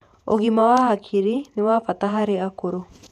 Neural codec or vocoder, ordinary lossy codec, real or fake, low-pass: vocoder, 48 kHz, 128 mel bands, Vocos; none; fake; 14.4 kHz